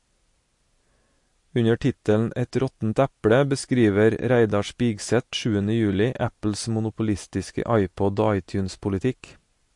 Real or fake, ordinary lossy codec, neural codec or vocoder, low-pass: real; MP3, 48 kbps; none; 10.8 kHz